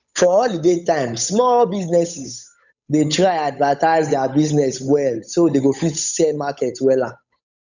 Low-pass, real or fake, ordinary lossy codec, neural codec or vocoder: 7.2 kHz; fake; none; codec, 16 kHz, 8 kbps, FunCodec, trained on Chinese and English, 25 frames a second